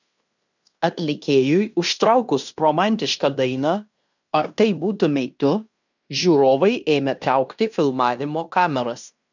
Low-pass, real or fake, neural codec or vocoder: 7.2 kHz; fake; codec, 16 kHz in and 24 kHz out, 0.9 kbps, LongCat-Audio-Codec, fine tuned four codebook decoder